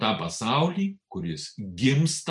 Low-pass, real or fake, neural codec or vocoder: 10.8 kHz; real; none